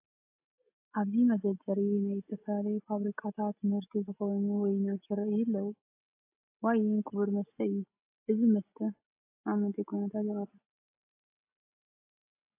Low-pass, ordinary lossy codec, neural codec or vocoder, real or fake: 3.6 kHz; AAC, 32 kbps; none; real